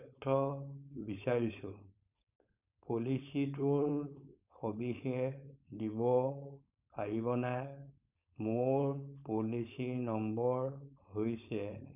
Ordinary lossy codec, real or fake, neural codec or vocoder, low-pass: none; fake; codec, 16 kHz, 4.8 kbps, FACodec; 3.6 kHz